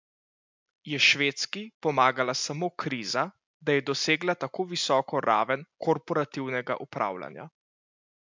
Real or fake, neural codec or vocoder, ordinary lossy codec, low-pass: real; none; MP3, 64 kbps; 7.2 kHz